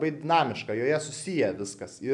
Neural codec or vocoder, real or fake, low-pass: none; real; 10.8 kHz